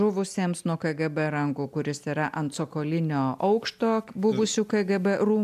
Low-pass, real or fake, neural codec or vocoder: 14.4 kHz; real; none